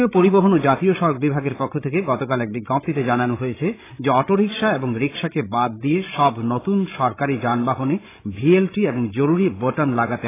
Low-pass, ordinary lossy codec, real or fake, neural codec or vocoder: 3.6 kHz; AAC, 16 kbps; fake; codec, 16 kHz, 16 kbps, FreqCodec, larger model